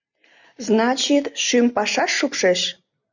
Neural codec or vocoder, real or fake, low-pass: none; real; 7.2 kHz